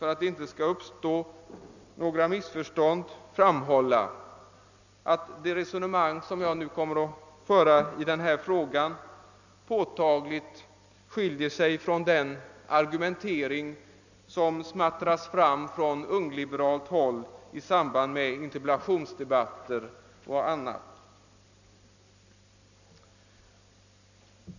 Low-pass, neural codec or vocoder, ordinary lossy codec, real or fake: 7.2 kHz; none; none; real